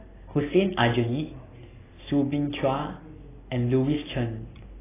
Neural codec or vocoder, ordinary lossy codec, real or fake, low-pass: none; AAC, 16 kbps; real; 3.6 kHz